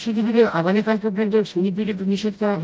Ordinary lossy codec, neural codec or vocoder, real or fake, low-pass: none; codec, 16 kHz, 0.5 kbps, FreqCodec, smaller model; fake; none